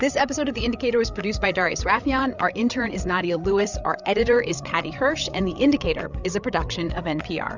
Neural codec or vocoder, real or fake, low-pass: codec, 16 kHz, 8 kbps, FreqCodec, larger model; fake; 7.2 kHz